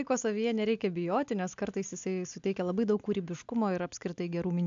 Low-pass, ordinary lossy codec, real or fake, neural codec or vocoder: 7.2 kHz; AAC, 64 kbps; real; none